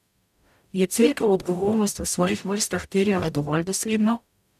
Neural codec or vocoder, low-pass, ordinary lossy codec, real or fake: codec, 44.1 kHz, 0.9 kbps, DAC; 14.4 kHz; none; fake